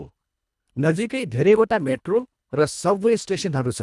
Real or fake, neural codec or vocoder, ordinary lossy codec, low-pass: fake; codec, 24 kHz, 1.5 kbps, HILCodec; none; none